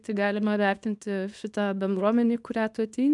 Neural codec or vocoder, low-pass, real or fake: codec, 24 kHz, 0.9 kbps, WavTokenizer, small release; 10.8 kHz; fake